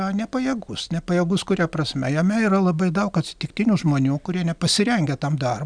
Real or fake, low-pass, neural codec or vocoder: real; 9.9 kHz; none